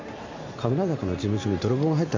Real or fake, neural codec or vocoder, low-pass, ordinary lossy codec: real; none; 7.2 kHz; MP3, 48 kbps